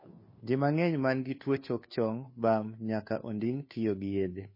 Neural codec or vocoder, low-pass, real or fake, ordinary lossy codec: codec, 16 kHz, 2 kbps, FunCodec, trained on Chinese and English, 25 frames a second; 5.4 kHz; fake; MP3, 24 kbps